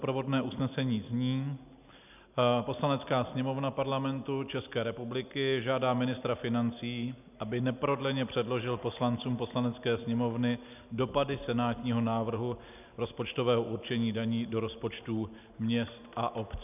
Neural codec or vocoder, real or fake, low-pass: none; real; 3.6 kHz